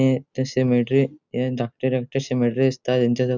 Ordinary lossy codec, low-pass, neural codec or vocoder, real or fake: none; 7.2 kHz; none; real